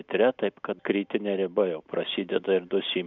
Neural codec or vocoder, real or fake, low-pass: none; real; 7.2 kHz